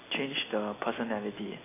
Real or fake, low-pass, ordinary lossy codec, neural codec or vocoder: real; 3.6 kHz; AAC, 16 kbps; none